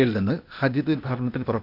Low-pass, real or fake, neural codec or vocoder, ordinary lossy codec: 5.4 kHz; fake; codec, 16 kHz, 0.8 kbps, ZipCodec; none